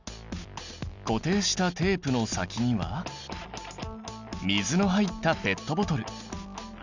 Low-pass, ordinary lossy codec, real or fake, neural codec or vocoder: 7.2 kHz; none; real; none